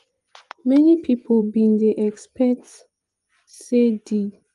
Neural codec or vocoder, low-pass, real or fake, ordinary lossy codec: vocoder, 24 kHz, 100 mel bands, Vocos; 10.8 kHz; fake; Opus, 32 kbps